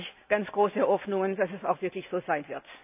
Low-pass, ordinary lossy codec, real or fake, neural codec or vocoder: 3.6 kHz; AAC, 32 kbps; real; none